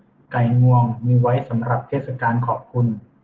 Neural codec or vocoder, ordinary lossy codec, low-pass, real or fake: none; Opus, 16 kbps; 7.2 kHz; real